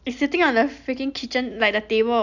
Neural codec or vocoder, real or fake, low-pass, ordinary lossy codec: none; real; 7.2 kHz; none